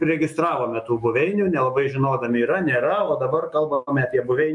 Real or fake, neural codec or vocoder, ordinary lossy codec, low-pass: fake; autoencoder, 48 kHz, 128 numbers a frame, DAC-VAE, trained on Japanese speech; MP3, 48 kbps; 10.8 kHz